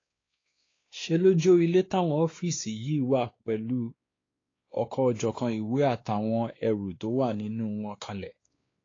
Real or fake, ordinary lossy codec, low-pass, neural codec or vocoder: fake; AAC, 32 kbps; 7.2 kHz; codec, 16 kHz, 2 kbps, X-Codec, WavLM features, trained on Multilingual LibriSpeech